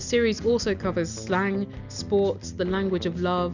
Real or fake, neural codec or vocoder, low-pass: real; none; 7.2 kHz